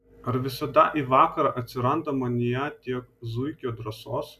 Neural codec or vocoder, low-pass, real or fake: none; 14.4 kHz; real